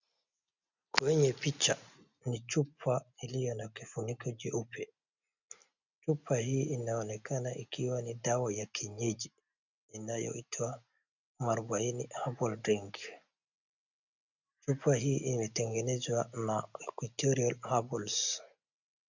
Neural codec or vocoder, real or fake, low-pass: none; real; 7.2 kHz